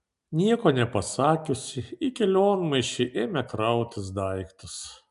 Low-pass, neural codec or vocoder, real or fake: 10.8 kHz; none; real